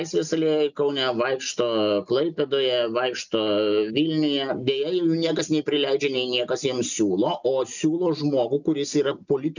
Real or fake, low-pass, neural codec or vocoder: real; 7.2 kHz; none